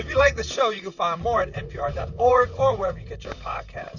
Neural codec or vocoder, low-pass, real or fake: vocoder, 44.1 kHz, 128 mel bands, Pupu-Vocoder; 7.2 kHz; fake